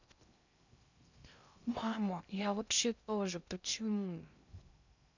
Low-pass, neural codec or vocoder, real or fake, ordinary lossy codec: 7.2 kHz; codec, 16 kHz in and 24 kHz out, 0.6 kbps, FocalCodec, streaming, 2048 codes; fake; none